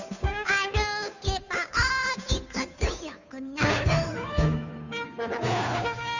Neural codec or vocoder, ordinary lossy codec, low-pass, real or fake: codec, 16 kHz, 2 kbps, FunCodec, trained on Chinese and English, 25 frames a second; none; 7.2 kHz; fake